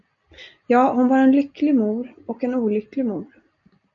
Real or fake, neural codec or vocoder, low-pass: real; none; 7.2 kHz